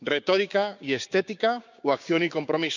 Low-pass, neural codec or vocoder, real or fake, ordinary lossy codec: 7.2 kHz; codec, 16 kHz, 6 kbps, DAC; fake; none